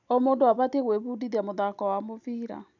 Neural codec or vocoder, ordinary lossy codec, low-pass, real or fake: none; none; 7.2 kHz; real